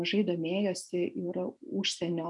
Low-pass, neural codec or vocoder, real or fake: 10.8 kHz; none; real